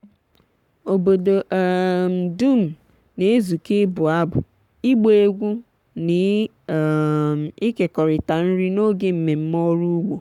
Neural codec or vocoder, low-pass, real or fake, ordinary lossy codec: codec, 44.1 kHz, 7.8 kbps, Pupu-Codec; 19.8 kHz; fake; none